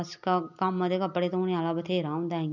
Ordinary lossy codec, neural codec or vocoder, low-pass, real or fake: none; none; 7.2 kHz; real